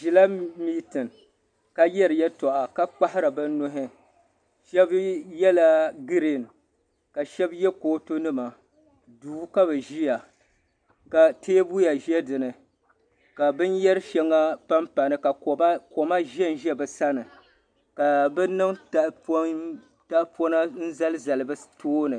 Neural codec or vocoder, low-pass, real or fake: none; 9.9 kHz; real